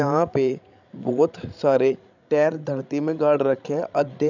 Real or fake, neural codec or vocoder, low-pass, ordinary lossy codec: fake; codec, 16 kHz, 16 kbps, FreqCodec, larger model; 7.2 kHz; none